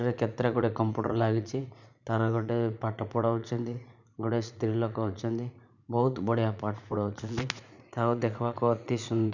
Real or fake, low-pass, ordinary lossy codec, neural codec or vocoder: fake; 7.2 kHz; none; vocoder, 44.1 kHz, 80 mel bands, Vocos